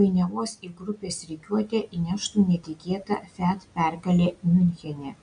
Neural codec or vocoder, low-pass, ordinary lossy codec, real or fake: none; 9.9 kHz; MP3, 96 kbps; real